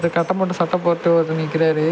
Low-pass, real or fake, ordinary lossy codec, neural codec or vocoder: none; real; none; none